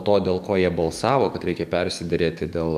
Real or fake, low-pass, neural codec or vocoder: fake; 14.4 kHz; codec, 44.1 kHz, 7.8 kbps, DAC